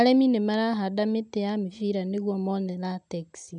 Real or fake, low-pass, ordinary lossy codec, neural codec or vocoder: real; 9.9 kHz; none; none